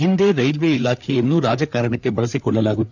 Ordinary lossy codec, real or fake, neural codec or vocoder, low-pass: none; fake; codec, 16 kHz, 4 kbps, FreqCodec, larger model; 7.2 kHz